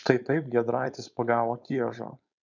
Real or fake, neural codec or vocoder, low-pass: fake; codec, 16 kHz, 4.8 kbps, FACodec; 7.2 kHz